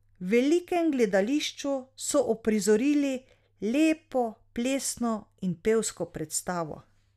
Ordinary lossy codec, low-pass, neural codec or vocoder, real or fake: none; 14.4 kHz; none; real